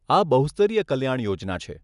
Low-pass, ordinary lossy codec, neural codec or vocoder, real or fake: 10.8 kHz; none; none; real